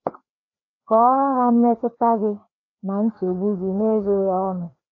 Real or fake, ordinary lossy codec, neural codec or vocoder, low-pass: fake; AAC, 48 kbps; codec, 16 kHz, 2 kbps, FreqCodec, larger model; 7.2 kHz